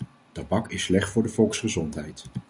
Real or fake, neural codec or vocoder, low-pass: real; none; 10.8 kHz